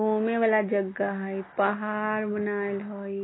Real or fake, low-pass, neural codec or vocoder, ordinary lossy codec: real; 7.2 kHz; none; AAC, 16 kbps